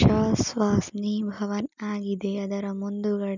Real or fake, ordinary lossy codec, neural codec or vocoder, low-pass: real; none; none; 7.2 kHz